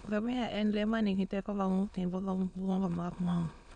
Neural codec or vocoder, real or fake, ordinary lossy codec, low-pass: autoencoder, 22.05 kHz, a latent of 192 numbers a frame, VITS, trained on many speakers; fake; none; 9.9 kHz